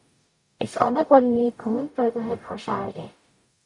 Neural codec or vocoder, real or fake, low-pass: codec, 44.1 kHz, 0.9 kbps, DAC; fake; 10.8 kHz